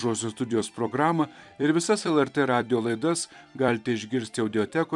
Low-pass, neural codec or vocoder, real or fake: 10.8 kHz; vocoder, 44.1 kHz, 128 mel bands every 256 samples, BigVGAN v2; fake